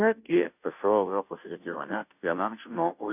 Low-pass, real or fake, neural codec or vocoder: 3.6 kHz; fake; codec, 16 kHz, 0.5 kbps, FunCodec, trained on Chinese and English, 25 frames a second